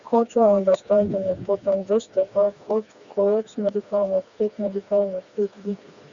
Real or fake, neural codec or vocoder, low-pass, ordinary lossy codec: fake; codec, 16 kHz, 2 kbps, FreqCodec, smaller model; 7.2 kHz; Opus, 64 kbps